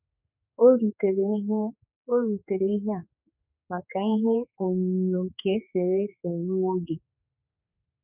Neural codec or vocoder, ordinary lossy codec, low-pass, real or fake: codec, 16 kHz, 4 kbps, X-Codec, HuBERT features, trained on general audio; none; 3.6 kHz; fake